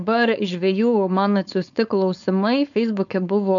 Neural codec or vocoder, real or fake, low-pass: codec, 16 kHz, 4.8 kbps, FACodec; fake; 7.2 kHz